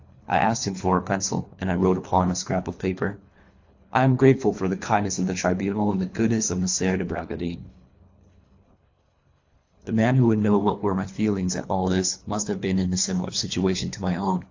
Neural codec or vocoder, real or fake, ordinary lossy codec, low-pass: codec, 24 kHz, 3 kbps, HILCodec; fake; AAC, 48 kbps; 7.2 kHz